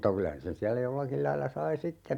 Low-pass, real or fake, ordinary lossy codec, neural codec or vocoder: 19.8 kHz; real; none; none